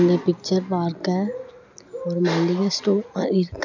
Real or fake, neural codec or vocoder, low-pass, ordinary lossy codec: real; none; 7.2 kHz; none